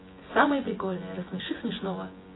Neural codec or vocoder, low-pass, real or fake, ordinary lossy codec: vocoder, 24 kHz, 100 mel bands, Vocos; 7.2 kHz; fake; AAC, 16 kbps